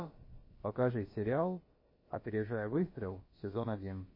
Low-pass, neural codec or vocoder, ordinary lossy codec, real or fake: 5.4 kHz; codec, 16 kHz, about 1 kbps, DyCAST, with the encoder's durations; MP3, 24 kbps; fake